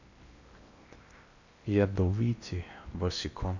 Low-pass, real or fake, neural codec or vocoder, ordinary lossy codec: 7.2 kHz; fake; codec, 16 kHz in and 24 kHz out, 0.8 kbps, FocalCodec, streaming, 65536 codes; none